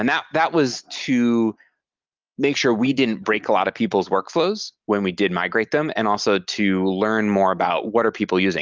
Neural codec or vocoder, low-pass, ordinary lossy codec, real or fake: none; 7.2 kHz; Opus, 32 kbps; real